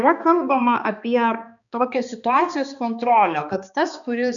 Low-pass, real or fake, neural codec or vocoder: 7.2 kHz; fake; codec, 16 kHz, 2 kbps, X-Codec, HuBERT features, trained on balanced general audio